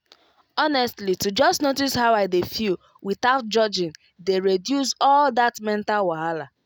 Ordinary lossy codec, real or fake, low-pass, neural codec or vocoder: none; real; none; none